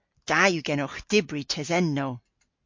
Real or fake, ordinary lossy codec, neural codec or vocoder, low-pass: real; MP3, 48 kbps; none; 7.2 kHz